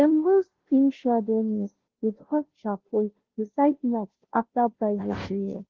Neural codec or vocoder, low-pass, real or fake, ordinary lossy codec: codec, 16 kHz, 0.5 kbps, FunCodec, trained on Chinese and English, 25 frames a second; 7.2 kHz; fake; Opus, 16 kbps